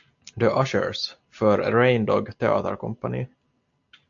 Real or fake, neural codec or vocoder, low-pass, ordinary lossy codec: real; none; 7.2 kHz; AAC, 64 kbps